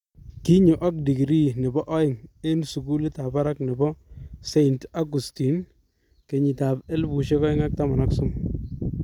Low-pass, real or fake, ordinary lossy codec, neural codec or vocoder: 19.8 kHz; real; none; none